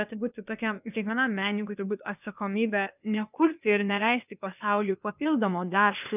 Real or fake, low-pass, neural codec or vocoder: fake; 3.6 kHz; codec, 16 kHz, 0.8 kbps, ZipCodec